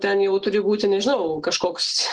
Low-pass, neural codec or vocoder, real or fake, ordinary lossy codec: 9.9 kHz; none; real; Opus, 16 kbps